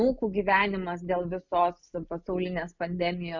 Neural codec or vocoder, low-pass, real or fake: none; 7.2 kHz; real